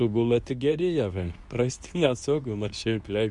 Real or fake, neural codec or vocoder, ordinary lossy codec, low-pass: fake; codec, 24 kHz, 0.9 kbps, WavTokenizer, medium speech release version 2; Opus, 64 kbps; 10.8 kHz